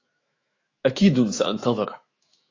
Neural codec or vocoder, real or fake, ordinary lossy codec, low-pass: autoencoder, 48 kHz, 128 numbers a frame, DAC-VAE, trained on Japanese speech; fake; AAC, 32 kbps; 7.2 kHz